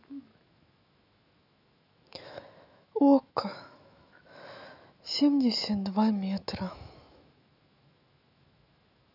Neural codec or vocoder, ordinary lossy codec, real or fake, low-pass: autoencoder, 48 kHz, 128 numbers a frame, DAC-VAE, trained on Japanese speech; none; fake; 5.4 kHz